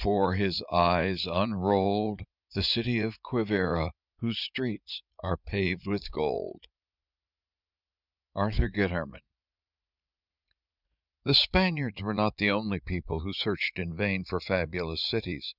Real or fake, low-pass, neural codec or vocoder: fake; 5.4 kHz; vocoder, 44.1 kHz, 80 mel bands, Vocos